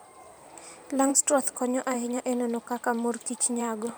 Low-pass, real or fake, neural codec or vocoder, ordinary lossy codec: none; fake; vocoder, 44.1 kHz, 128 mel bands every 512 samples, BigVGAN v2; none